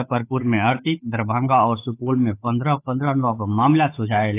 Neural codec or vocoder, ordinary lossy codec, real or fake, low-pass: codec, 16 kHz, 8 kbps, FunCodec, trained on Chinese and English, 25 frames a second; AAC, 24 kbps; fake; 3.6 kHz